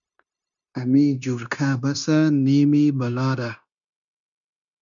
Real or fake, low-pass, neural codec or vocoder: fake; 7.2 kHz; codec, 16 kHz, 0.9 kbps, LongCat-Audio-Codec